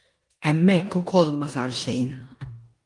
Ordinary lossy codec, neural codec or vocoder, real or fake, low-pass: Opus, 32 kbps; codec, 16 kHz in and 24 kHz out, 0.9 kbps, LongCat-Audio-Codec, four codebook decoder; fake; 10.8 kHz